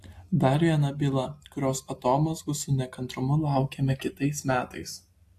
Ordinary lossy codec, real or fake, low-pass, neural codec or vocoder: AAC, 64 kbps; real; 14.4 kHz; none